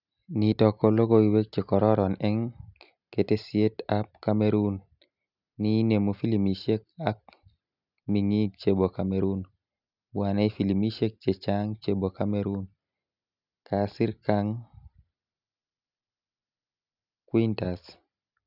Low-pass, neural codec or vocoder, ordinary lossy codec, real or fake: 5.4 kHz; none; none; real